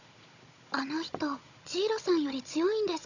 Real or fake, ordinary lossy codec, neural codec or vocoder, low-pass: fake; none; codec, 16 kHz, 16 kbps, FunCodec, trained on Chinese and English, 50 frames a second; 7.2 kHz